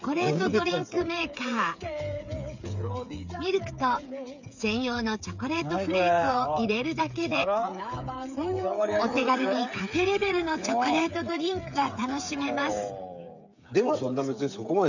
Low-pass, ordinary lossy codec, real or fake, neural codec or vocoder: 7.2 kHz; none; fake; codec, 16 kHz, 8 kbps, FreqCodec, smaller model